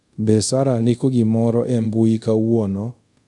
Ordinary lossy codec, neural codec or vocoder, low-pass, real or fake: AAC, 64 kbps; codec, 24 kHz, 0.5 kbps, DualCodec; 10.8 kHz; fake